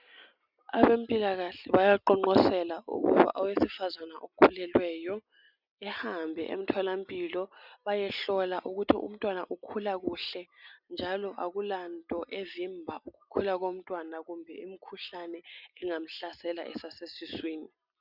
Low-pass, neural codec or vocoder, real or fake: 5.4 kHz; none; real